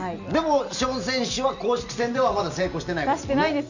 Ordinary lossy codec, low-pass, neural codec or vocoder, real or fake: none; 7.2 kHz; none; real